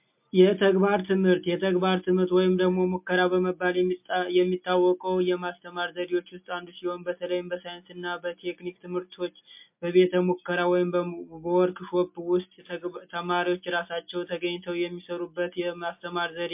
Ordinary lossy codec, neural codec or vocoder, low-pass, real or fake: MP3, 32 kbps; none; 3.6 kHz; real